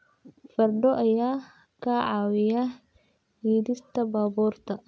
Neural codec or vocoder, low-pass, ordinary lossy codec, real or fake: none; none; none; real